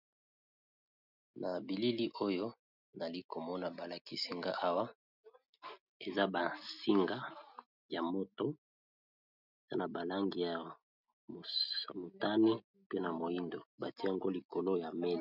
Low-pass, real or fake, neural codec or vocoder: 5.4 kHz; real; none